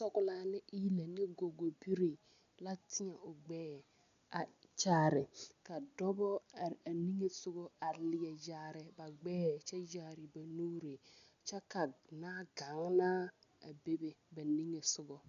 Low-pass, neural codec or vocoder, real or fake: 7.2 kHz; none; real